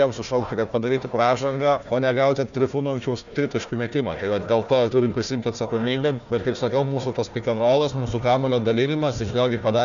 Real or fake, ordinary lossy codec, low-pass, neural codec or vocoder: fake; MP3, 96 kbps; 7.2 kHz; codec, 16 kHz, 1 kbps, FunCodec, trained on Chinese and English, 50 frames a second